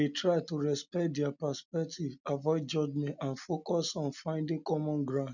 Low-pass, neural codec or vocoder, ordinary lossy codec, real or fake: 7.2 kHz; none; none; real